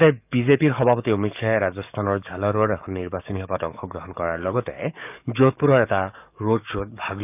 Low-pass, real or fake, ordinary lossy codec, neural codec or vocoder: 3.6 kHz; fake; none; codec, 44.1 kHz, 7.8 kbps, DAC